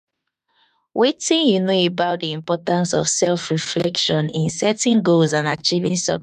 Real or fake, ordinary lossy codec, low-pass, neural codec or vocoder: fake; none; 14.4 kHz; autoencoder, 48 kHz, 32 numbers a frame, DAC-VAE, trained on Japanese speech